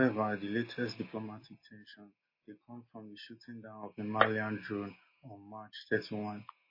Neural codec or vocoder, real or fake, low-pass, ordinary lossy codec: none; real; 5.4 kHz; MP3, 24 kbps